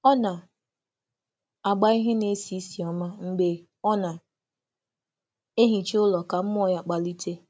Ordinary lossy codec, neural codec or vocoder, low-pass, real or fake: none; none; none; real